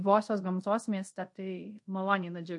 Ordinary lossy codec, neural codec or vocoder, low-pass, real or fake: MP3, 48 kbps; codec, 24 kHz, 0.5 kbps, DualCodec; 10.8 kHz; fake